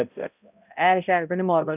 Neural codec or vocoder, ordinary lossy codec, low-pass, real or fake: codec, 16 kHz, 0.5 kbps, X-Codec, HuBERT features, trained on balanced general audio; none; 3.6 kHz; fake